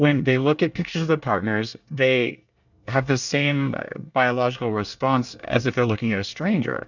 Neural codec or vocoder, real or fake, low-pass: codec, 24 kHz, 1 kbps, SNAC; fake; 7.2 kHz